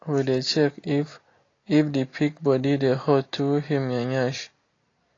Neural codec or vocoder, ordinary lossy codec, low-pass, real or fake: none; AAC, 32 kbps; 7.2 kHz; real